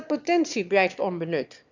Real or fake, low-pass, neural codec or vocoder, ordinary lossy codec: fake; 7.2 kHz; autoencoder, 22.05 kHz, a latent of 192 numbers a frame, VITS, trained on one speaker; none